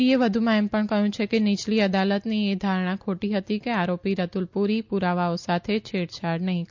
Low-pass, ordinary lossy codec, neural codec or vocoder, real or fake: 7.2 kHz; MP3, 64 kbps; none; real